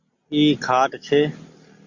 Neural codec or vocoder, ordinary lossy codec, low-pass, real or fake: none; Opus, 64 kbps; 7.2 kHz; real